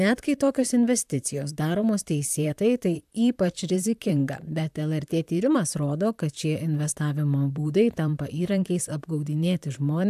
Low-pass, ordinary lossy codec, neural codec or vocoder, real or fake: 14.4 kHz; AAC, 96 kbps; vocoder, 44.1 kHz, 128 mel bands, Pupu-Vocoder; fake